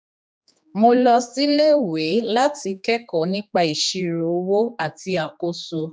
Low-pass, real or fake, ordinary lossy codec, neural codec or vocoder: none; fake; none; codec, 16 kHz, 2 kbps, X-Codec, HuBERT features, trained on general audio